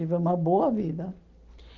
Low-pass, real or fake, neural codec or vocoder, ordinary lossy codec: 7.2 kHz; real; none; Opus, 32 kbps